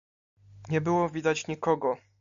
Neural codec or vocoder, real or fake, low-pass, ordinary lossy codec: none; real; 7.2 kHz; AAC, 96 kbps